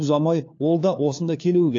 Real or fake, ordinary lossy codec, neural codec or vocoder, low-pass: fake; AAC, 48 kbps; codec, 16 kHz, 4 kbps, FunCodec, trained on LibriTTS, 50 frames a second; 7.2 kHz